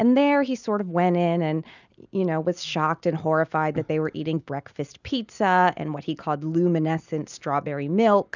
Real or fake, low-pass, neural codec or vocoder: real; 7.2 kHz; none